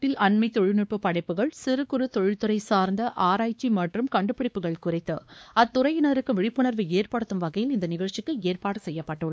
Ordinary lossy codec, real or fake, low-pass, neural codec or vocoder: none; fake; none; codec, 16 kHz, 2 kbps, X-Codec, WavLM features, trained on Multilingual LibriSpeech